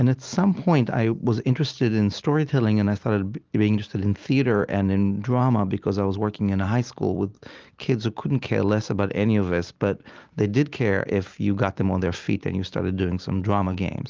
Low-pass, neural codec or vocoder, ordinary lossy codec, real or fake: 7.2 kHz; none; Opus, 32 kbps; real